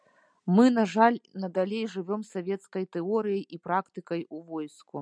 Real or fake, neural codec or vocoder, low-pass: real; none; 9.9 kHz